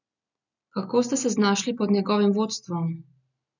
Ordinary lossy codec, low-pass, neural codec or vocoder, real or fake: none; 7.2 kHz; none; real